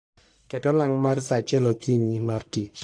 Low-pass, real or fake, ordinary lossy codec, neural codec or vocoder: 9.9 kHz; fake; AAC, 64 kbps; codec, 44.1 kHz, 1.7 kbps, Pupu-Codec